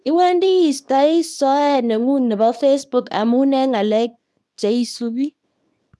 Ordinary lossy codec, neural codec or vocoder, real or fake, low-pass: none; codec, 24 kHz, 0.9 kbps, WavTokenizer, small release; fake; none